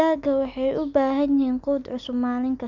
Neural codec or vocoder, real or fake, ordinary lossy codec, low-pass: none; real; none; 7.2 kHz